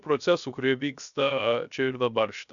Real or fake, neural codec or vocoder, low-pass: fake; codec, 16 kHz, 0.3 kbps, FocalCodec; 7.2 kHz